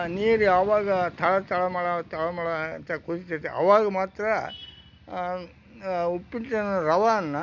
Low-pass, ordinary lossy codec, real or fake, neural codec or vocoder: 7.2 kHz; none; real; none